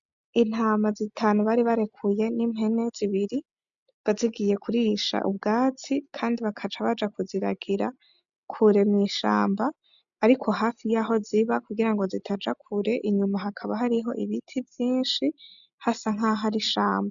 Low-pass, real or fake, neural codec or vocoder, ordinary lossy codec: 7.2 kHz; real; none; MP3, 96 kbps